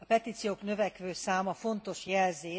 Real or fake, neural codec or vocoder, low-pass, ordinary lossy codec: real; none; none; none